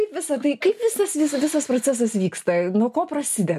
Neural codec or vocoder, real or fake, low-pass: none; real; 14.4 kHz